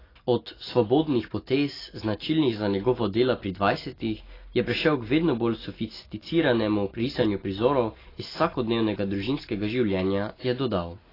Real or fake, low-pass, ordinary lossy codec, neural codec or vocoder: real; 5.4 kHz; AAC, 24 kbps; none